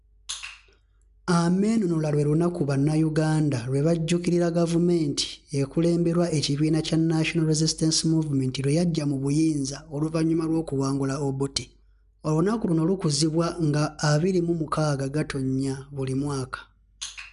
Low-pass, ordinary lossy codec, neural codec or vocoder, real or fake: 10.8 kHz; none; none; real